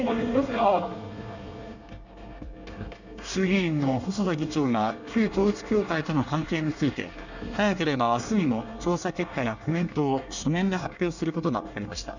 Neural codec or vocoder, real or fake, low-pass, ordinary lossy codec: codec, 24 kHz, 1 kbps, SNAC; fake; 7.2 kHz; none